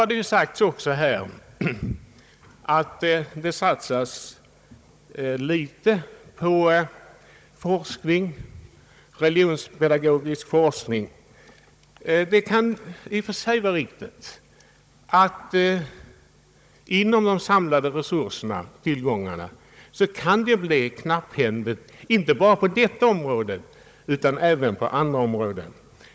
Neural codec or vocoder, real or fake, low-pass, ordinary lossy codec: codec, 16 kHz, 16 kbps, FunCodec, trained on Chinese and English, 50 frames a second; fake; none; none